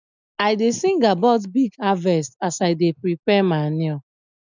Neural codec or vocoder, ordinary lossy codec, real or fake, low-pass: none; none; real; 7.2 kHz